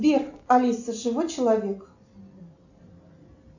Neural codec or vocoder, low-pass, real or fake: none; 7.2 kHz; real